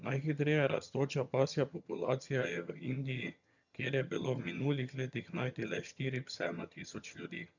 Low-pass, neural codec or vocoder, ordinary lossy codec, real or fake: 7.2 kHz; vocoder, 22.05 kHz, 80 mel bands, HiFi-GAN; none; fake